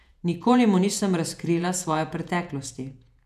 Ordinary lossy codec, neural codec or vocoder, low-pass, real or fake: none; vocoder, 48 kHz, 128 mel bands, Vocos; 14.4 kHz; fake